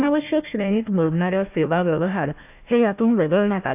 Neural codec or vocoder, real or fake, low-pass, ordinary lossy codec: codec, 16 kHz, 1 kbps, FunCodec, trained on Chinese and English, 50 frames a second; fake; 3.6 kHz; none